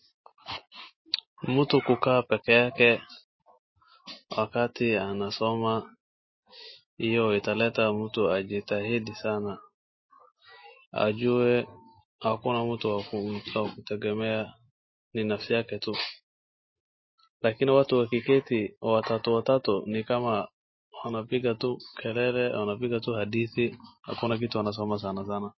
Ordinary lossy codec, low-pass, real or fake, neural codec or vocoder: MP3, 24 kbps; 7.2 kHz; real; none